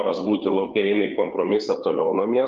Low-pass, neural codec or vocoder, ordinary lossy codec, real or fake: 7.2 kHz; codec, 16 kHz, 4 kbps, FreqCodec, larger model; Opus, 24 kbps; fake